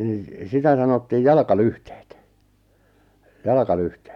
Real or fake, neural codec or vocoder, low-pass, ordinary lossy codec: real; none; 19.8 kHz; none